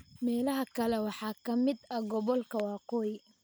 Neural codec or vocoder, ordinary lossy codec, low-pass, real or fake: none; none; none; real